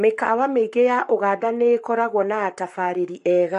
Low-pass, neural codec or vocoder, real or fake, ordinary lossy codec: 14.4 kHz; codec, 44.1 kHz, 7.8 kbps, Pupu-Codec; fake; MP3, 48 kbps